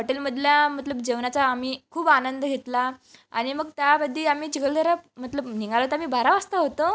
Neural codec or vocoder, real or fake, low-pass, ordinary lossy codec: none; real; none; none